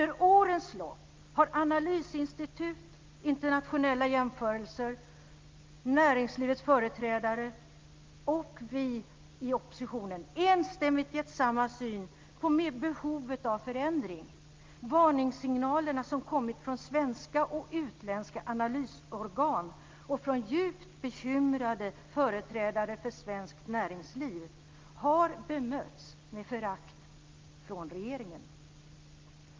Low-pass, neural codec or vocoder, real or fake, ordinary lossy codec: 7.2 kHz; none; real; Opus, 24 kbps